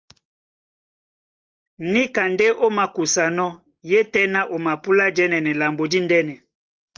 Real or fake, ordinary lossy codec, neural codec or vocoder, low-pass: real; Opus, 24 kbps; none; 7.2 kHz